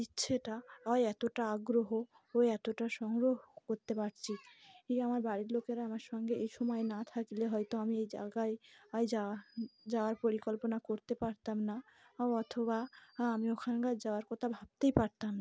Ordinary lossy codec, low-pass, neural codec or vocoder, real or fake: none; none; none; real